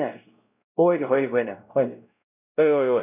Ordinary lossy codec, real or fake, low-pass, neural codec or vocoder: none; fake; 3.6 kHz; codec, 16 kHz, 0.5 kbps, X-Codec, WavLM features, trained on Multilingual LibriSpeech